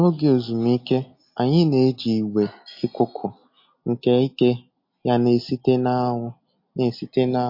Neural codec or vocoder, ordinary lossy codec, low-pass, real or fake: none; MP3, 32 kbps; 5.4 kHz; real